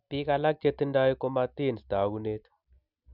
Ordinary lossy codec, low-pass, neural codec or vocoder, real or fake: none; 5.4 kHz; none; real